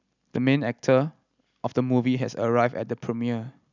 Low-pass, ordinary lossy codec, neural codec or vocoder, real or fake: 7.2 kHz; none; none; real